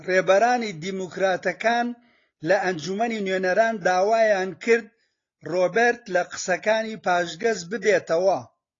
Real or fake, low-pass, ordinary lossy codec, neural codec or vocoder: real; 7.2 kHz; AAC, 32 kbps; none